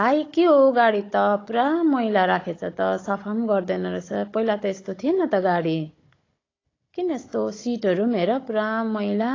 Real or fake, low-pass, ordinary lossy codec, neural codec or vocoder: fake; 7.2 kHz; AAC, 32 kbps; codec, 16 kHz, 16 kbps, FunCodec, trained on Chinese and English, 50 frames a second